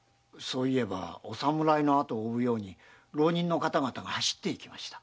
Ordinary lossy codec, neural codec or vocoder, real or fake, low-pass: none; none; real; none